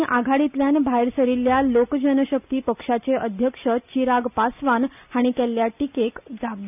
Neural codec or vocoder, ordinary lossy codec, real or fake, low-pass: none; none; real; 3.6 kHz